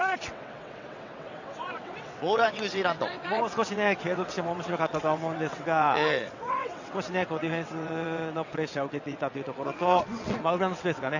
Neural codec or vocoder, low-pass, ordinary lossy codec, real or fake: vocoder, 22.05 kHz, 80 mel bands, WaveNeXt; 7.2 kHz; none; fake